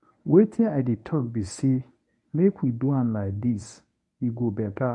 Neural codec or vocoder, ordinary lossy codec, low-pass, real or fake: codec, 24 kHz, 0.9 kbps, WavTokenizer, medium speech release version 1; none; 10.8 kHz; fake